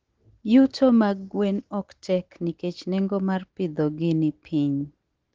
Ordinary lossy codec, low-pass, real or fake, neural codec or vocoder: Opus, 16 kbps; 7.2 kHz; real; none